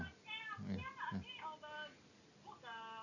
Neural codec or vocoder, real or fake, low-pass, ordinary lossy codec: none; real; 7.2 kHz; none